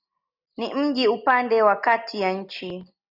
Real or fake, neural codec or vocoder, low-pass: real; none; 5.4 kHz